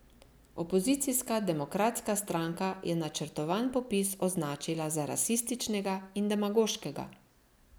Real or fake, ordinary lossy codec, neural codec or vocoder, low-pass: real; none; none; none